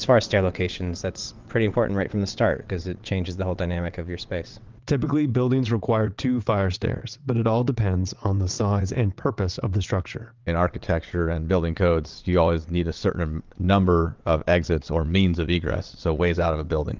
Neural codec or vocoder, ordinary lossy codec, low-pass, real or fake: vocoder, 22.05 kHz, 80 mel bands, WaveNeXt; Opus, 32 kbps; 7.2 kHz; fake